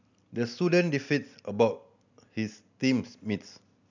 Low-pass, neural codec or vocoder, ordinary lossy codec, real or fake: 7.2 kHz; none; none; real